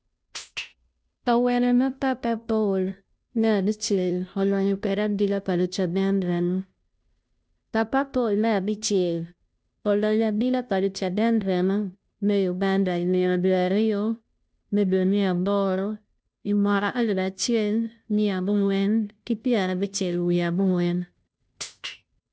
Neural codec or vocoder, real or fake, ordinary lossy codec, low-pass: codec, 16 kHz, 0.5 kbps, FunCodec, trained on Chinese and English, 25 frames a second; fake; none; none